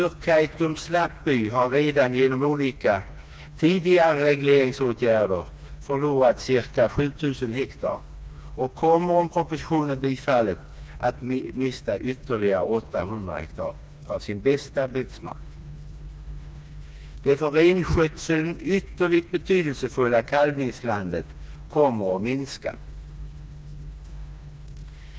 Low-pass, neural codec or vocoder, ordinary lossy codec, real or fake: none; codec, 16 kHz, 2 kbps, FreqCodec, smaller model; none; fake